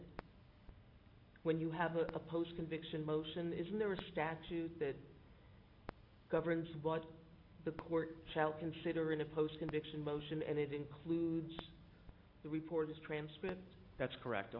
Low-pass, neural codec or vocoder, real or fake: 5.4 kHz; none; real